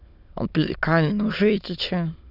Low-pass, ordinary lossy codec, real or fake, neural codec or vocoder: 5.4 kHz; none; fake; autoencoder, 22.05 kHz, a latent of 192 numbers a frame, VITS, trained on many speakers